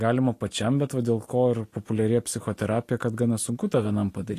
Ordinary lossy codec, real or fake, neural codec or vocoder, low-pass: AAC, 48 kbps; real; none; 14.4 kHz